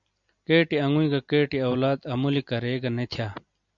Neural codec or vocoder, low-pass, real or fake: none; 7.2 kHz; real